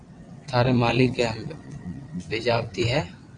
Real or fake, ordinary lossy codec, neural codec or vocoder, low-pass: fake; AAC, 64 kbps; vocoder, 22.05 kHz, 80 mel bands, WaveNeXt; 9.9 kHz